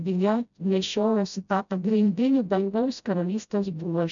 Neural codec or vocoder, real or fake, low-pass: codec, 16 kHz, 0.5 kbps, FreqCodec, smaller model; fake; 7.2 kHz